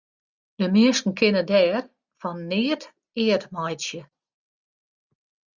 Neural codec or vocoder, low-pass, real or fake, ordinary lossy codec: none; 7.2 kHz; real; Opus, 64 kbps